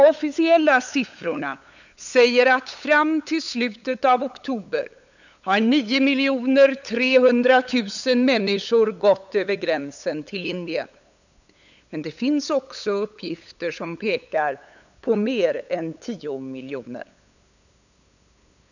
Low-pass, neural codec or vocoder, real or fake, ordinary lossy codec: 7.2 kHz; codec, 16 kHz, 8 kbps, FunCodec, trained on LibriTTS, 25 frames a second; fake; none